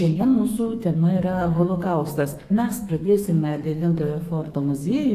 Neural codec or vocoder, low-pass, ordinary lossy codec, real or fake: codec, 32 kHz, 1.9 kbps, SNAC; 14.4 kHz; AAC, 64 kbps; fake